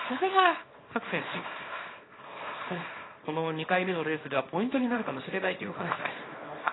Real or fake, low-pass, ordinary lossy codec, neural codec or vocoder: fake; 7.2 kHz; AAC, 16 kbps; codec, 24 kHz, 0.9 kbps, WavTokenizer, small release